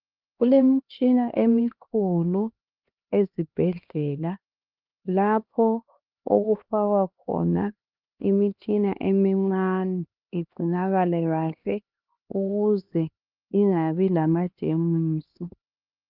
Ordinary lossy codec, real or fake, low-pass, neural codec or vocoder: Opus, 32 kbps; fake; 5.4 kHz; codec, 16 kHz, 2 kbps, X-Codec, HuBERT features, trained on LibriSpeech